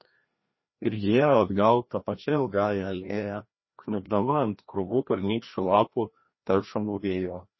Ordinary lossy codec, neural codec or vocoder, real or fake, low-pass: MP3, 24 kbps; codec, 16 kHz, 1 kbps, FreqCodec, larger model; fake; 7.2 kHz